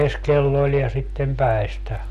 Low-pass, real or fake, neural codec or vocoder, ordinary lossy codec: 14.4 kHz; real; none; none